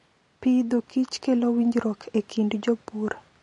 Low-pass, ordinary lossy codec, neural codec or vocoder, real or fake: 10.8 kHz; MP3, 48 kbps; none; real